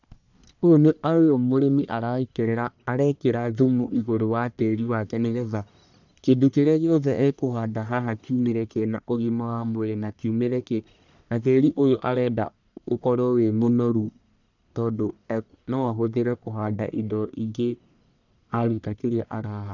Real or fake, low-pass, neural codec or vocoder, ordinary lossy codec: fake; 7.2 kHz; codec, 44.1 kHz, 1.7 kbps, Pupu-Codec; none